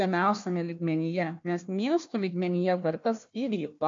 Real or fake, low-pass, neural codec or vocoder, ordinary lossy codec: fake; 7.2 kHz; codec, 16 kHz, 1 kbps, FunCodec, trained on Chinese and English, 50 frames a second; MP3, 48 kbps